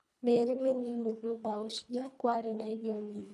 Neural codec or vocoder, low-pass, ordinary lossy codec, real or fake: codec, 24 kHz, 1.5 kbps, HILCodec; none; none; fake